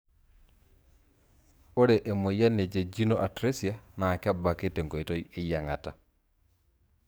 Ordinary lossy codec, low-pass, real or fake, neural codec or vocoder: none; none; fake; codec, 44.1 kHz, 7.8 kbps, DAC